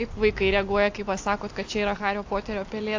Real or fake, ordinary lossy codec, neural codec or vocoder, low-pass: real; AAC, 48 kbps; none; 7.2 kHz